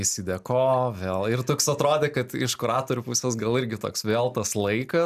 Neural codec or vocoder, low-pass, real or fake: none; 14.4 kHz; real